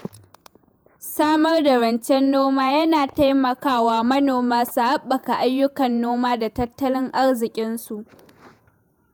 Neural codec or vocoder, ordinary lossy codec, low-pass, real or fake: vocoder, 48 kHz, 128 mel bands, Vocos; none; none; fake